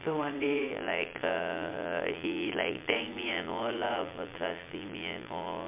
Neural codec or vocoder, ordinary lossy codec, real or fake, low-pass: vocoder, 22.05 kHz, 80 mel bands, Vocos; MP3, 32 kbps; fake; 3.6 kHz